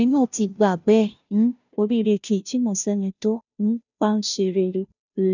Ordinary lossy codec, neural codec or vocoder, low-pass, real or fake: none; codec, 16 kHz, 0.5 kbps, FunCodec, trained on Chinese and English, 25 frames a second; 7.2 kHz; fake